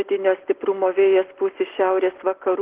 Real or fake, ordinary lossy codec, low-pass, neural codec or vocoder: real; Opus, 16 kbps; 3.6 kHz; none